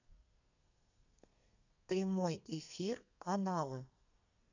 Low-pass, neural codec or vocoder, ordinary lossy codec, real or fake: 7.2 kHz; codec, 32 kHz, 1.9 kbps, SNAC; none; fake